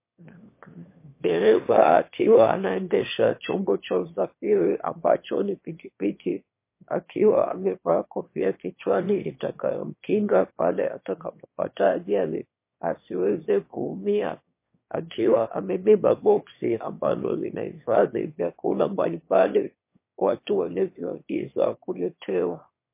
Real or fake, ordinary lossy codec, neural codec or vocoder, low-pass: fake; MP3, 24 kbps; autoencoder, 22.05 kHz, a latent of 192 numbers a frame, VITS, trained on one speaker; 3.6 kHz